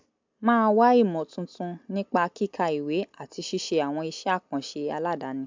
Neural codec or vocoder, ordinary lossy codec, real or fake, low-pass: none; MP3, 64 kbps; real; 7.2 kHz